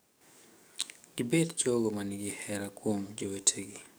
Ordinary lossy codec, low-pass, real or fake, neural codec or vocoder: none; none; fake; codec, 44.1 kHz, 7.8 kbps, DAC